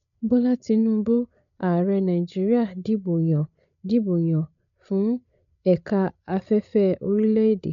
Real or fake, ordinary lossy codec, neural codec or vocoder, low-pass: fake; none; codec, 16 kHz, 8 kbps, FreqCodec, larger model; 7.2 kHz